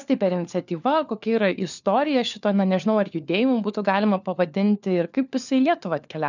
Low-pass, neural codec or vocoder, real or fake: 7.2 kHz; codec, 16 kHz, 4 kbps, FunCodec, trained on LibriTTS, 50 frames a second; fake